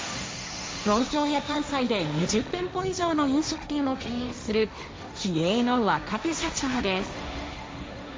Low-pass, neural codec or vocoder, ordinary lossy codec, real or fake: none; codec, 16 kHz, 1.1 kbps, Voila-Tokenizer; none; fake